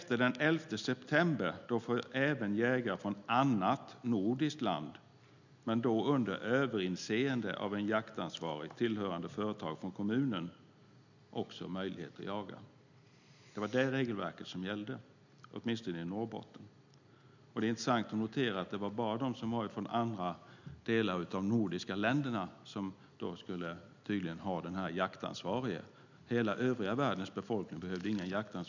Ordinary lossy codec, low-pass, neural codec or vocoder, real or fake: none; 7.2 kHz; none; real